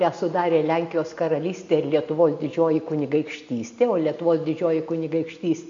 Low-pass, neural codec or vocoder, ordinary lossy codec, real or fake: 7.2 kHz; none; MP3, 48 kbps; real